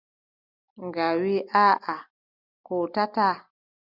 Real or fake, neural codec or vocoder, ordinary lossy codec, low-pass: real; none; Opus, 64 kbps; 5.4 kHz